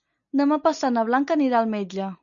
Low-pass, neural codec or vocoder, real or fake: 7.2 kHz; none; real